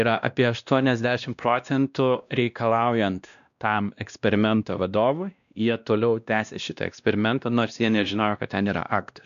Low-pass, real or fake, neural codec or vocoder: 7.2 kHz; fake; codec, 16 kHz, 1 kbps, X-Codec, WavLM features, trained on Multilingual LibriSpeech